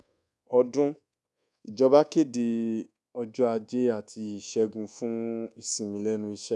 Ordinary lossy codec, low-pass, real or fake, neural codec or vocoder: none; none; fake; codec, 24 kHz, 1.2 kbps, DualCodec